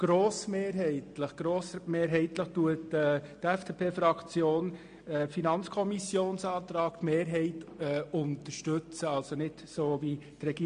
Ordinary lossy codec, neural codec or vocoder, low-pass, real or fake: MP3, 48 kbps; none; 9.9 kHz; real